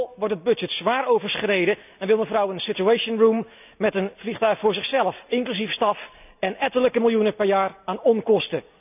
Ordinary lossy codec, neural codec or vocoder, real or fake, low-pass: none; none; real; 3.6 kHz